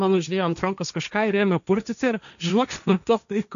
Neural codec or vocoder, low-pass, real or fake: codec, 16 kHz, 1.1 kbps, Voila-Tokenizer; 7.2 kHz; fake